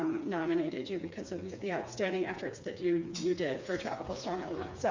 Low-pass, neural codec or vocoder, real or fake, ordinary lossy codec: 7.2 kHz; codec, 16 kHz, 4 kbps, FreqCodec, smaller model; fake; MP3, 64 kbps